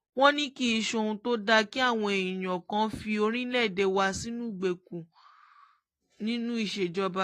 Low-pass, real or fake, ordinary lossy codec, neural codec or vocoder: 14.4 kHz; real; AAC, 48 kbps; none